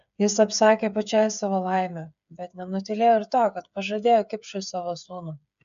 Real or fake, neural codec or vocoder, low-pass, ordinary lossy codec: fake; codec, 16 kHz, 8 kbps, FreqCodec, smaller model; 7.2 kHz; AAC, 96 kbps